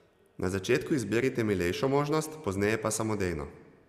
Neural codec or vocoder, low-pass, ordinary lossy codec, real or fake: none; 14.4 kHz; Opus, 64 kbps; real